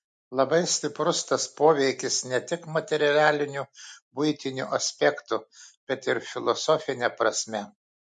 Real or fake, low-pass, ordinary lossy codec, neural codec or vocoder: real; 10.8 kHz; MP3, 48 kbps; none